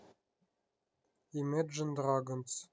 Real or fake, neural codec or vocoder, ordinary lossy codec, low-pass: real; none; none; none